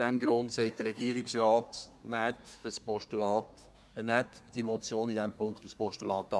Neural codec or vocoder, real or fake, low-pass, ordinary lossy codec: codec, 24 kHz, 1 kbps, SNAC; fake; none; none